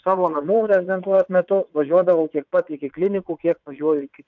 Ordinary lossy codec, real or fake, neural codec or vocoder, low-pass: MP3, 64 kbps; fake; vocoder, 22.05 kHz, 80 mel bands, WaveNeXt; 7.2 kHz